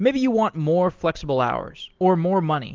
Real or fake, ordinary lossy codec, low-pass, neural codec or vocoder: real; Opus, 16 kbps; 7.2 kHz; none